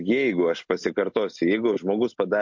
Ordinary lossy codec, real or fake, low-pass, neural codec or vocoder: MP3, 48 kbps; real; 7.2 kHz; none